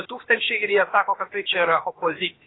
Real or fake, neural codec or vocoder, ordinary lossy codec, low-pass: fake; codec, 16 kHz, about 1 kbps, DyCAST, with the encoder's durations; AAC, 16 kbps; 7.2 kHz